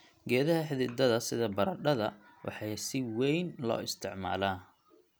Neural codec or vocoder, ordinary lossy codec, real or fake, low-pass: none; none; real; none